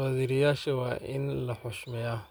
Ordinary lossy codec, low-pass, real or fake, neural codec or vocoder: none; none; real; none